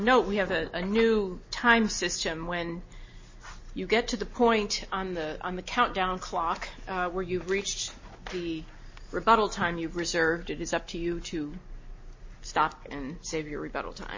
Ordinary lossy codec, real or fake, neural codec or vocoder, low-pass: MP3, 32 kbps; real; none; 7.2 kHz